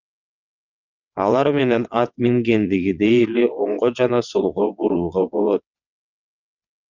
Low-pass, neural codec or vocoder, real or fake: 7.2 kHz; vocoder, 22.05 kHz, 80 mel bands, WaveNeXt; fake